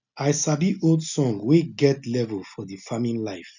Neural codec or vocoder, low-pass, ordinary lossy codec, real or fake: none; 7.2 kHz; none; real